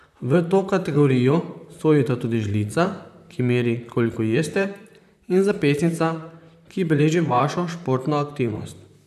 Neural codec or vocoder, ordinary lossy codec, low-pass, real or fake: vocoder, 44.1 kHz, 128 mel bands, Pupu-Vocoder; none; 14.4 kHz; fake